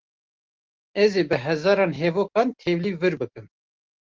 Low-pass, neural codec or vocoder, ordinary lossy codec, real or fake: 7.2 kHz; none; Opus, 16 kbps; real